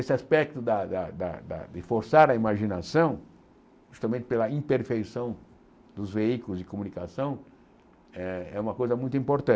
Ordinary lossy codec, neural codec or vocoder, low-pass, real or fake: none; none; none; real